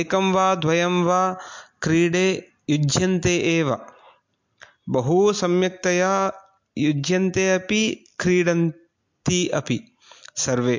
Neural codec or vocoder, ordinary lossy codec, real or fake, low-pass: none; MP3, 48 kbps; real; 7.2 kHz